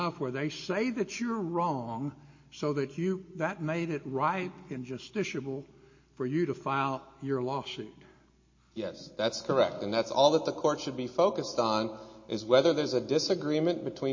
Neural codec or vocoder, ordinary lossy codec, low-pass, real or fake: none; MP3, 32 kbps; 7.2 kHz; real